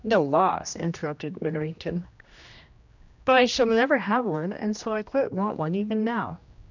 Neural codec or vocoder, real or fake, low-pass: codec, 16 kHz, 1 kbps, X-Codec, HuBERT features, trained on general audio; fake; 7.2 kHz